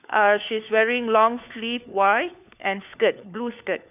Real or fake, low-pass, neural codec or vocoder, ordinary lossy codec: fake; 3.6 kHz; codec, 16 kHz, 4 kbps, FunCodec, trained on LibriTTS, 50 frames a second; none